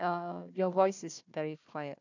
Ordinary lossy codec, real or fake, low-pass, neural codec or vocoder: none; fake; 7.2 kHz; codec, 16 kHz, 1 kbps, FunCodec, trained on Chinese and English, 50 frames a second